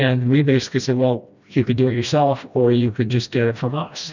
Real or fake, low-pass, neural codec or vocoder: fake; 7.2 kHz; codec, 16 kHz, 1 kbps, FreqCodec, smaller model